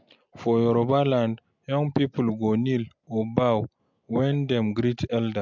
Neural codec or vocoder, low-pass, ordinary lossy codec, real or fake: none; 7.2 kHz; MP3, 64 kbps; real